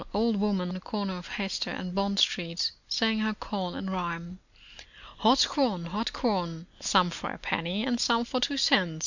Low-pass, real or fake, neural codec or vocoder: 7.2 kHz; real; none